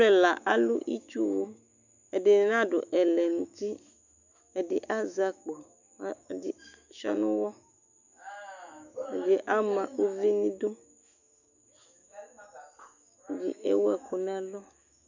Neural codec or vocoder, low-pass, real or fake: autoencoder, 48 kHz, 128 numbers a frame, DAC-VAE, trained on Japanese speech; 7.2 kHz; fake